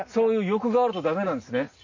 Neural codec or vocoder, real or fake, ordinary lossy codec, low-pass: none; real; MP3, 48 kbps; 7.2 kHz